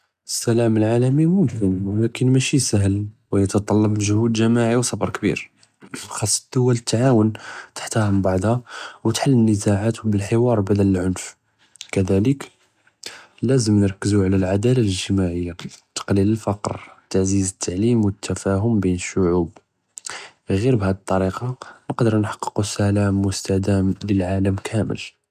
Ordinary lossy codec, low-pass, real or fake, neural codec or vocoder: none; 14.4 kHz; real; none